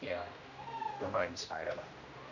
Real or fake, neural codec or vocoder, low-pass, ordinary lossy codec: fake; codec, 16 kHz, 1 kbps, X-Codec, HuBERT features, trained on general audio; 7.2 kHz; none